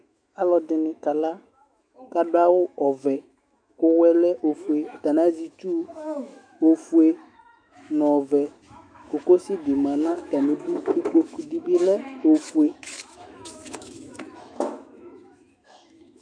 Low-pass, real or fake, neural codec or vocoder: 9.9 kHz; fake; autoencoder, 48 kHz, 128 numbers a frame, DAC-VAE, trained on Japanese speech